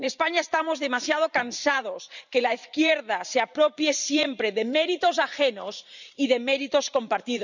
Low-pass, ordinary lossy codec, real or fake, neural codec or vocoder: 7.2 kHz; none; fake; vocoder, 44.1 kHz, 128 mel bands every 512 samples, BigVGAN v2